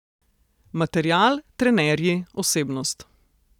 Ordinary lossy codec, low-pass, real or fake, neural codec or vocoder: none; 19.8 kHz; real; none